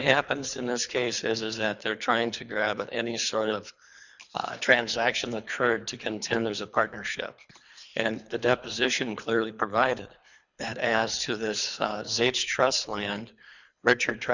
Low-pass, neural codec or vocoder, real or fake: 7.2 kHz; codec, 24 kHz, 3 kbps, HILCodec; fake